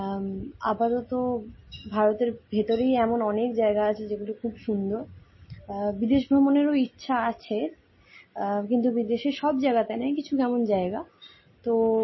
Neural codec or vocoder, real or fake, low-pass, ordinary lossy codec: none; real; 7.2 kHz; MP3, 24 kbps